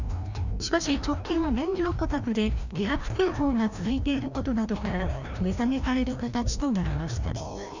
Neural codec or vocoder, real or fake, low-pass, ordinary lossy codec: codec, 16 kHz, 1 kbps, FreqCodec, larger model; fake; 7.2 kHz; none